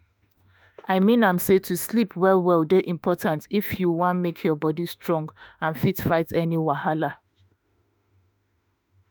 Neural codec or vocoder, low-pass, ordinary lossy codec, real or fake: autoencoder, 48 kHz, 32 numbers a frame, DAC-VAE, trained on Japanese speech; none; none; fake